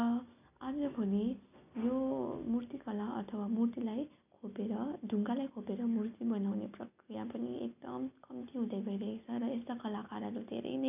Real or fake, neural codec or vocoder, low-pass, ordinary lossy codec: real; none; 3.6 kHz; none